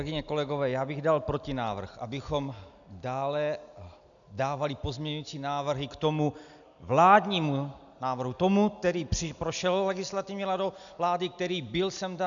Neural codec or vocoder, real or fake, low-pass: none; real; 7.2 kHz